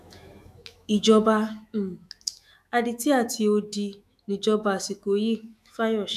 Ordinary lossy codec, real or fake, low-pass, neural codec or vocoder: none; fake; 14.4 kHz; autoencoder, 48 kHz, 128 numbers a frame, DAC-VAE, trained on Japanese speech